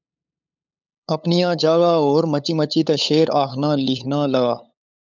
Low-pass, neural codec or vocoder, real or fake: 7.2 kHz; codec, 16 kHz, 8 kbps, FunCodec, trained on LibriTTS, 25 frames a second; fake